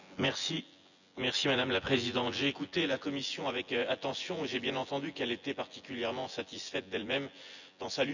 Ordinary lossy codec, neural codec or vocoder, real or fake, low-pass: none; vocoder, 24 kHz, 100 mel bands, Vocos; fake; 7.2 kHz